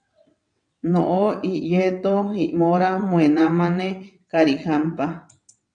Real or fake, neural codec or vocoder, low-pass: fake; vocoder, 22.05 kHz, 80 mel bands, WaveNeXt; 9.9 kHz